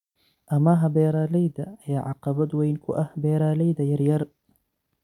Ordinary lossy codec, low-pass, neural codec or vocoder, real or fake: none; 19.8 kHz; none; real